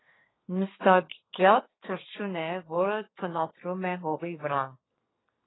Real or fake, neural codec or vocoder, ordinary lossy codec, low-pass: fake; codec, 32 kHz, 1.9 kbps, SNAC; AAC, 16 kbps; 7.2 kHz